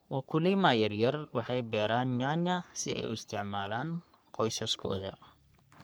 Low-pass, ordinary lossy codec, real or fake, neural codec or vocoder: none; none; fake; codec, 44.1 kHz, 3.4 kbps, Pupu-Codec